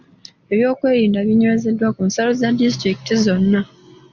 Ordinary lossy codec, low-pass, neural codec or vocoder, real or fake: Opus, 64 kbps; 7.2 kHz; none; real